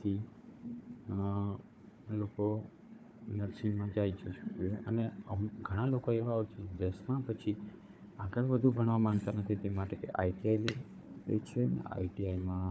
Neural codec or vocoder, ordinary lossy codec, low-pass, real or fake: codec, 16 kHz, 4 kbps, FunCodec, trained on Chinese and English, 50 frames a second; none; none; fake